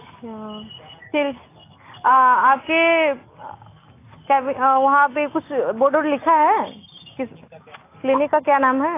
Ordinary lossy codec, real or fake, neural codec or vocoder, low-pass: AAC, 24 kbps; real; none; 3.6 kHz